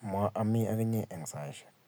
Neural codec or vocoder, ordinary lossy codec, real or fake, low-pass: none; none; real; none